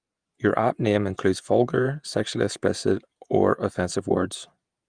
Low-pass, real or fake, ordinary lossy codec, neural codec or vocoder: 9.9 kHz; fake; Opus, 32 kbps; vocoder, 48 kHz, 128 mel bands, Vocos